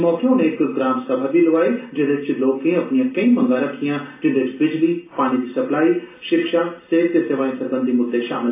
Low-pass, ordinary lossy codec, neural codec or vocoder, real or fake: 3.6 kHz; AAC, 24 kbps; none; real